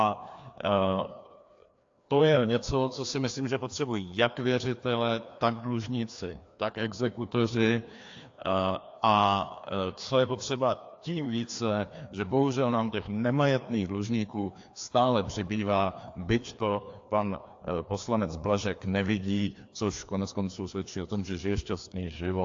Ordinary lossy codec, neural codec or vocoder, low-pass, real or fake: AAC, 48 kbps; codec, 16 kHz, 2 kbps, FreqCodec, larger model; 7.2 kHz; fake